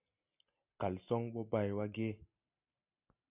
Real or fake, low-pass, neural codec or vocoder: real; 3.6 kHz; none